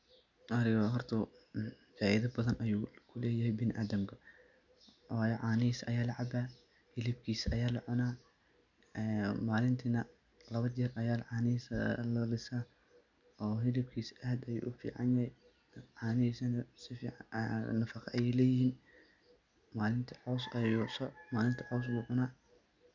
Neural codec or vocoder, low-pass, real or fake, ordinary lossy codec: none; 7.2 kHz; real; none